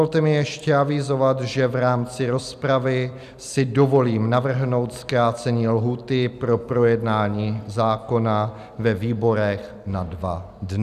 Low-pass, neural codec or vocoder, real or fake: 14.4 kHz; none; real